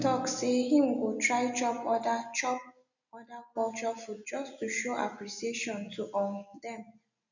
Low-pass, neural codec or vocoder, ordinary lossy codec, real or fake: 7.2 kHz; none; none; real